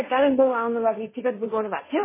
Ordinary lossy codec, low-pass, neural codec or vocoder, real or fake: MP3, 16 kbps; 3.6 kHz; codec, 16 kHz, 1.1 kbps, Voila-Tokenizer; fake